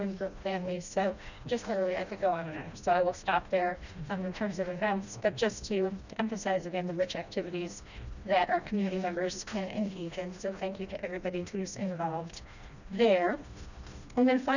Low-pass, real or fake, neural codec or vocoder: 7.2 kHz; fake; codec, 16 kHz, 1 kbps, FreqCodec, smaller model